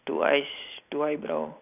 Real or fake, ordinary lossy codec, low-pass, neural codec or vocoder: real; none; 3.6 kHz; none